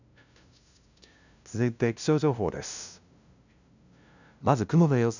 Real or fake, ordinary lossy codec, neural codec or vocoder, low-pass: fake; none; codec, 16 kHz, 0.5 kbps, FunCodec, trained on LibriTTS, 25 frames a second; 7.2 kHz